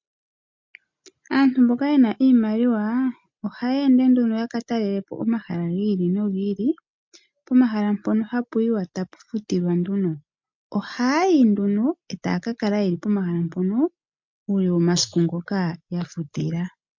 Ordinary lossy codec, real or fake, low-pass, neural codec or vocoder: MP3, 48 kbps; real; 7.2 kHz; none